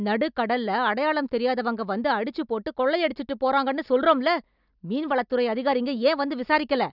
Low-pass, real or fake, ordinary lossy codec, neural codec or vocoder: 5.4 kHz; real; none; none